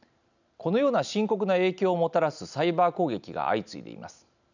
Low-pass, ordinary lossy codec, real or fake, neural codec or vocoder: 7.2 kHz; none; real; none